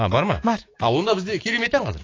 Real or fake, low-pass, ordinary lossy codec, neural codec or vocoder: fake; 7.2 kHz; AAC, 32 kbps; codec, 16 kHz, 6 kbps, DAC